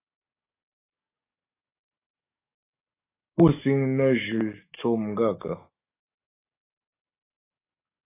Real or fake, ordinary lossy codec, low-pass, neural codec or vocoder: fake; AAC, 24 kbps; 3.6 kHz; codec, 44.1 kHz, 7.8 kbps, DAC